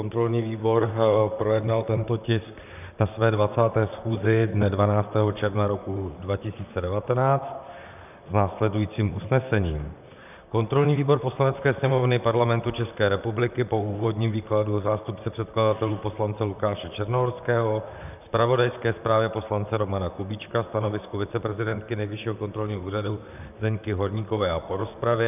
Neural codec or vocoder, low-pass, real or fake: vocoder, 44.1 kHz, 128 mel bands, Pupu-Vocoder; 3.6 kHz; fake